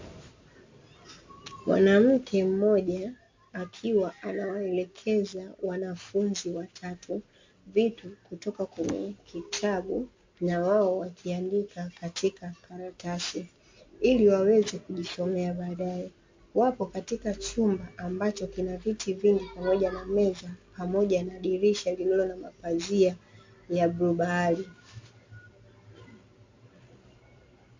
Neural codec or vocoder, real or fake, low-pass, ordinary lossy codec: none; real; 7.2 kHz; MP3, 48 kbps